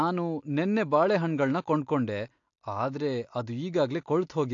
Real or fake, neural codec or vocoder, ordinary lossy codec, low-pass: real; none; AAC, 48 kbps; 7.2 kHz